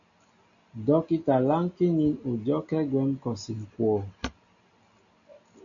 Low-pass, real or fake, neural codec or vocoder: 7.2 kHz; real; none